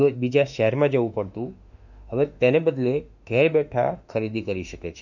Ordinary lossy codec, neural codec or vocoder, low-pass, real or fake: none; autoencoder, 48 kHz, 32 numbers a frame, DAC-VAE, trained on Japanese speech; 7.2 kHz; fake